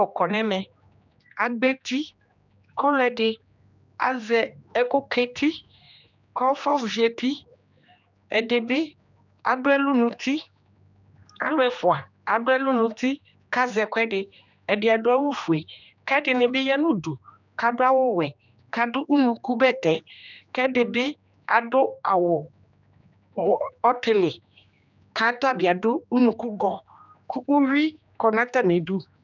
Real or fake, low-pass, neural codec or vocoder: fake; 7.2 kHz; codec, 16 kHz, 2 kbps, X-Codec, HuBERT features, trained on general audio